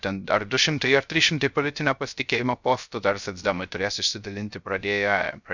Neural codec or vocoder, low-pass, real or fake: codec, 16 kHz, 0.3 kbps, FocalCodec; 7.2 kHz; fake